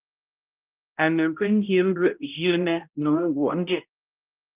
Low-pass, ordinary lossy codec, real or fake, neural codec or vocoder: 3.6 kHz; Opus, 32 kbps; fake; codec, 16 kHz, 0.5 kbps, X-Codec, HuBERT features, trained on balanced general audio